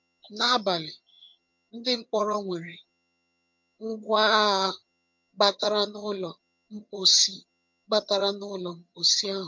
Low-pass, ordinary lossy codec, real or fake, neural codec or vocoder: 7.2 kHz; MP3, 48 kbps; fake; vocoder, 22.05 kHz, 80 mel bands, HiFi-GAN